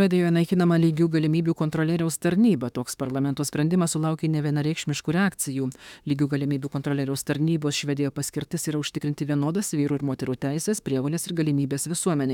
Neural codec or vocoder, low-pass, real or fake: autoencoder, 48 kHz, 32 numbers a frame, DAC-VAE, trained on Japanese speech; 19.8 kHz; fake